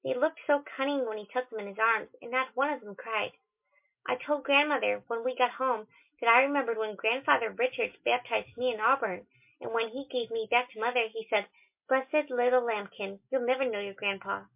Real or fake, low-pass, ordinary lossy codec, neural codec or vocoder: real; 3.6 kHz; MP3, 32 kbps; none